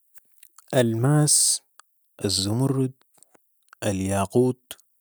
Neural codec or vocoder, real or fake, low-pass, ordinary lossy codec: none; real; none; none